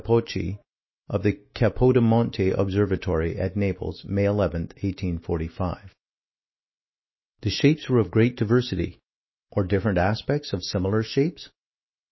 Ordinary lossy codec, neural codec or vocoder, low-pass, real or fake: MP3, 24 kbps; autoencoder, 48 kHz, 128 numbers a frame, DAC-VAE, trained on Japanese speech; 7.2 kHz; fake